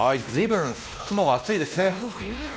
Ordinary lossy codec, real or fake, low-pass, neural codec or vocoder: none; fake; none; codec, 16 kHz, 1 kbps, X-Codec, WavLM features, trained on Multilingual LibriSpeech